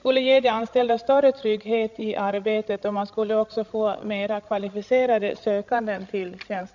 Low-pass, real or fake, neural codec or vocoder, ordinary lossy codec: 7.2 kHz; fake; codec, 16 kHz, 16 kbps, FreqCodec, larger model; none